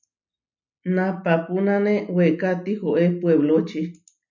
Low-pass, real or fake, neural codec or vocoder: 7.2 kHz; real; none